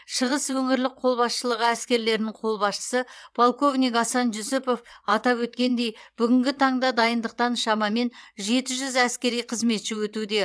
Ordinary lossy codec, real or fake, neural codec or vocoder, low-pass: none; fake; vocoder, 22.05 kHz, 80 mel bands, WaveNeXt; none